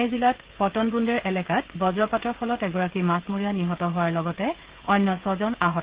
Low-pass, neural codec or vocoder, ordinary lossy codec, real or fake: 3.6 kHz; codec, 16 kHz, 16 kbps, FreqCodec, smaller model; Opus, 16 kbps; fake